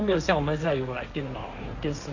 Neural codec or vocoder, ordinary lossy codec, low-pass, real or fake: codec, 16 kHz, 1.1 kbps, Voila-Tokenizer; none; 7.2 kHz; fake